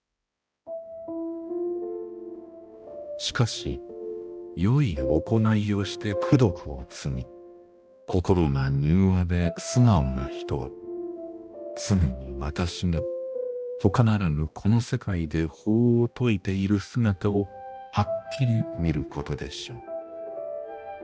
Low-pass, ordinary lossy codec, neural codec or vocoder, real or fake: none; none; codec, 16 kHz, 1 kbps, X-Codec, HuBERT features, trained on balanced general audio; fake